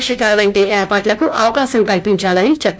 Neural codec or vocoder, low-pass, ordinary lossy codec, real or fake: codec, 16 kHz, 1 kbps, FunCodec, trained on LibriTTS, 50 frames a second; none; none; fake